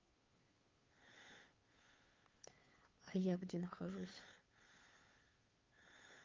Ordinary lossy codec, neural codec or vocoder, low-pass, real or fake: Opus, 32 kbps; codec, 16 kHz, 2 kbps, FunCodec, trained on Chinese and English, 25 frames a second; 7.2 kHz; fake